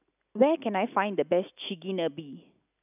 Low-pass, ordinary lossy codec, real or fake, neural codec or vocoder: 3.6 kHz; none; real; none